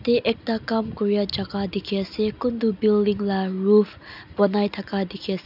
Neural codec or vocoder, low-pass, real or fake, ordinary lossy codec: none; 5.4 kHz; real; none